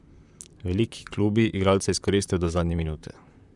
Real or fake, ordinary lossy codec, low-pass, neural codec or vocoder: fake; none; 10.8 kHz; vocoder, 44.1 kHz, 128 mel bands, Pupu-Vocoder